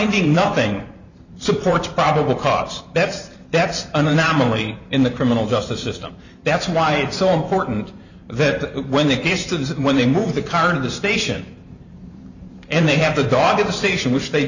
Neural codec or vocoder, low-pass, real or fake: vocoder, 44.1 kHz, 128 mel bands every 512 samples, BigVGAN v2; 7.2 kHz; fake